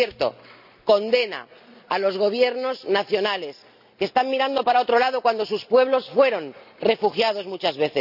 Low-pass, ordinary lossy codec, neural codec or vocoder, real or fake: 5.4 kHz; none; none; real